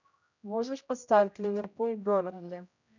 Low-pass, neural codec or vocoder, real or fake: 7.2 kHz; codec, 16 kHz, 0.5 kbps, X-Codec, HuBERT features, trained on general audio; fake